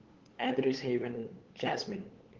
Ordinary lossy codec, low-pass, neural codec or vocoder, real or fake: Opus, 16 kbps; 7.2 kHz; codec, 16 kHz, 8 kbps, FunCodec, trained on LibriTTS, 25 frames a second; fake